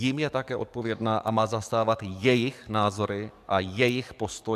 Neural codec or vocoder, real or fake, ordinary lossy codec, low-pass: codec, 44.1 kHz, 7.8 kbps, DAC; fake; AAC, 96 kbps; 14.4 kHz